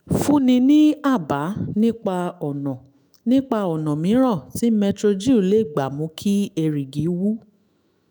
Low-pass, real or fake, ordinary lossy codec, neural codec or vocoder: none; fake; none; autoencoder, 48 kHz, 128 numbers a frame, DAC-VAE, trained on Japanese speech